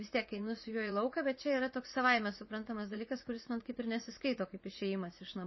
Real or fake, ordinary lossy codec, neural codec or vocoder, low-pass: fake; MP3, 24 kbps; vocoder, 44.1 kHz, 80 mel bands, Vocos; 7.2 kHz